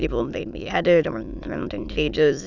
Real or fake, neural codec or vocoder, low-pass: fake; autoencoder, 22.05 kHz, a latent of 192 numbers a frame, VITS, trained on many speakers; 7.2 kHz